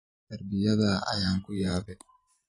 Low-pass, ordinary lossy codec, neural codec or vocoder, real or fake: 10.8 kHz; AAC, 32 kbps; vocoder, 44.1 kHz, 128 mel bands every 512 samples, BigVGAN v2; fake